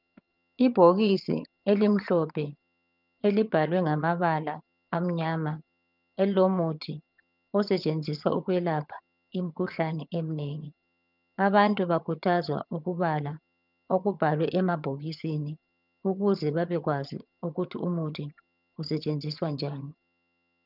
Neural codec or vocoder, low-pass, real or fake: vocoder, 22.05 kHz, 80 mel bands, HiFi-GAN; 5.4 kHz; fake